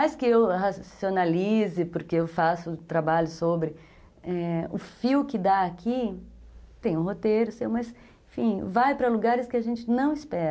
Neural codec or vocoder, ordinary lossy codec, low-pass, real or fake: none; none; none; real